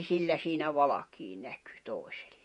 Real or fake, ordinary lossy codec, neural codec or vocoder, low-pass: fake; MP3, 48 kbps; vocoder, 48 kHz, 128 mel bands, Vocos; 14.4 kHz